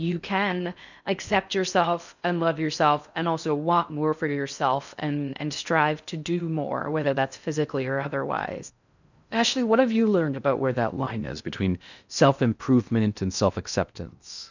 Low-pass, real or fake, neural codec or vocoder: 7.2 kHz; fake; codec, 16 kHz in and 24 kHz out, 0.6 kbps, FocalCodec, streaming, 4096 codes